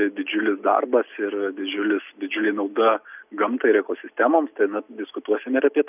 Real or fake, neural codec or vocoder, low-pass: real; none; 3.6 kHz